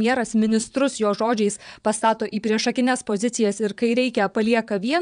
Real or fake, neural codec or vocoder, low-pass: fake; vocoder, 22.05 kHz, 80 mel bands, Vocos; 9.9 kHz